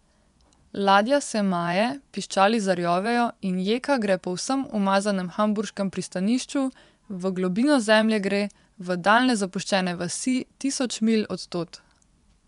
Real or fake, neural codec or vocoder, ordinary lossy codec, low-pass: fake; vocoder, 24 kHz, 100 mel bands, Vocos; none; 10.8 kHz